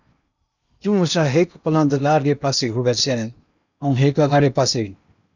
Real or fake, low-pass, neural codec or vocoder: fake; 7.2 kHz; codec, 16 kHz in and 24 kHz out, 0.8 kbps, FocalCodec, streaming, 65536 codes